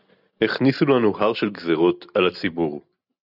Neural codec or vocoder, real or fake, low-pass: none; real; 5.4 kHz